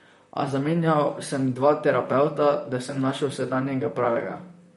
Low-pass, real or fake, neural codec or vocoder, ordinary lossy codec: 19.8 kHz; fake; vocoder, 44.1 kHz, 128 mel bands, Pupu-Vocoder; MP3, 48 kbps